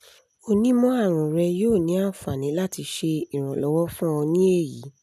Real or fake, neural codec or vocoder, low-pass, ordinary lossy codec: real; none; 14.4 kHz; none